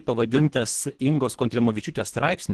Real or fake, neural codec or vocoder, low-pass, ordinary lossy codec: fake; codec, 24 kHz, 1.5 kbps, HILCodec; 10.8 kHz; Opus, 16 kbps